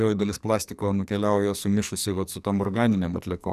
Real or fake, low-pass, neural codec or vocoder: fake; 14.4 kHz; codec, 44.1 kHz, 2.6 kbps, SNAC